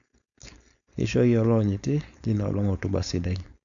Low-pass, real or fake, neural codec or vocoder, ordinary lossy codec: 7.2 kHz; fake; codec, 16 kHz, 4.8 kbps, FACodec; none